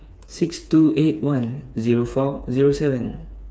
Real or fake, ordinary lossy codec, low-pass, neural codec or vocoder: fake; none; none; codec, 16 kHz, 4 kbps, FreqCodec, smaller model